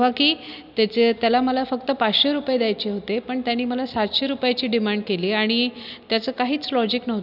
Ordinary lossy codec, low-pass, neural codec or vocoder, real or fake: none; 5.4 kHz; none; real